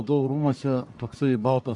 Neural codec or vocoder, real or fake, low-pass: codec, 44.1 kHz, 1.7 kbps, Pupu-Codec; fake; 10.8 kHz